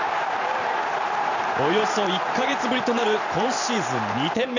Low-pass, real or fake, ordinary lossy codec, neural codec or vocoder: 7.2 kHz; fake; none; vocoder, 44.1 kHz, 128 mel bands every 512 samples, BigVGAN v2